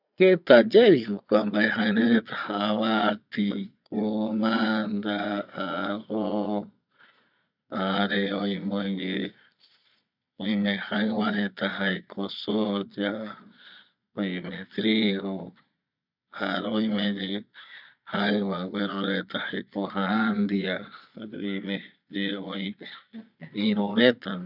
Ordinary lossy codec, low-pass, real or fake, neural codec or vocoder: none; 5.4 kHz; fake; vocoder, 22.05 kHz, 80 mel bands, Vocos